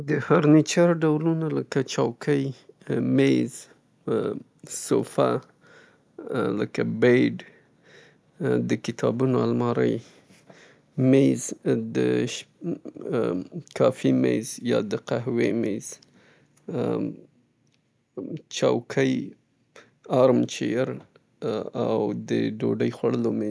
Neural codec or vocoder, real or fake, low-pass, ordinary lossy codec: none; real; none; none